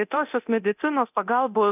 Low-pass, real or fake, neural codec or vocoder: 3.6 kHz; fake; codec, 24 kHz, 0.9 kbps, DualCodec